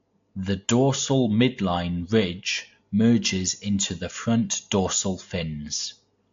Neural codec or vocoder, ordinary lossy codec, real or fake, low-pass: none; AAC, 48 kbps; real; 7.2 kHz